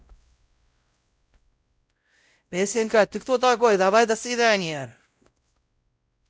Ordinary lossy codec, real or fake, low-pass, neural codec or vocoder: none; fake; none; codec, 16 kHz, 0.5 kbps, X-Codec, WavLM features, trained on Multilingual LibriSpeech